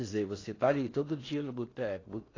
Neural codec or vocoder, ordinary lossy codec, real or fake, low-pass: codec, 16 kHz in and 24 kHz out, 0.8 kbps, FocalCodec, streaming, 65536 codes; AAC, 32 kbps; fake; 7.2 kHz